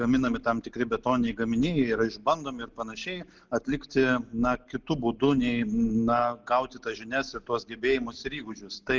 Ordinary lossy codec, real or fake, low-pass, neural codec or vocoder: Opus, 16 kbps; real; 7.2 kHz; none